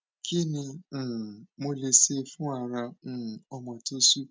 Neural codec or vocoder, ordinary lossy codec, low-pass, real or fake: none; none; none; real